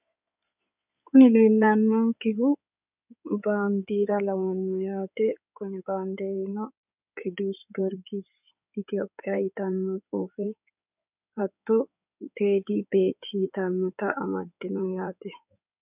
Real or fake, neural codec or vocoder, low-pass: fake; codec, 16 kHz in and 24 kHz out, 2.2 kbps, FireRedTTS-2 codec; 3.6 kHz